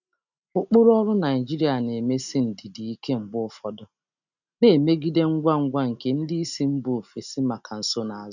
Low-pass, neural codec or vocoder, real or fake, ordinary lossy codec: 7.2 kHz; none; real; none